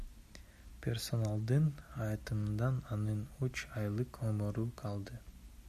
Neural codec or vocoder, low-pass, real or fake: none; 14.4 kHz; real